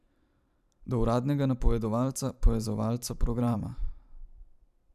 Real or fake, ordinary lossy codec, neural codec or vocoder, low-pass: fake; none; vocoder, 44.1 kHz, 128 mel bands every 512 samples, BigVGAN v2; 14.4 kHz